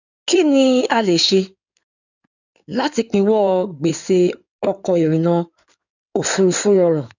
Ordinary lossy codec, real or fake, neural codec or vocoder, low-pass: none; fake; codec, 16 kHz in and 24 kHz out, 2.2 kbps, FireRedTTS-2 codec; 7.2 kHz